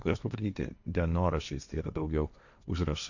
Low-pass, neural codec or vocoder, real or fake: 7.2 kHz; codec, 16 kHz, 1.1 kbps, Voila-Tokenizer; fake